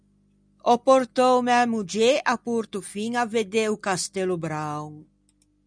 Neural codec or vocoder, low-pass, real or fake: none; 9.9 kHz; real